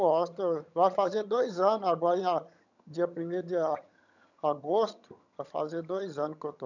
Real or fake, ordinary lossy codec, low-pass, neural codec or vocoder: fake; none; 7.2 kHz; vocoder, 22.05 kHz, 80 mel bands, HiFi-GAN